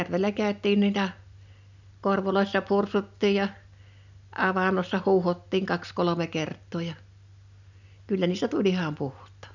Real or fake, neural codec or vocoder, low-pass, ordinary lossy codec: real; none; 7.2 kHz; none